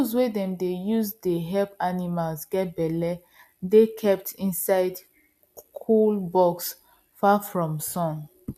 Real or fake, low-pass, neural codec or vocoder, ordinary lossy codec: real; 14.4 kHz; none; MP3, 96 kbps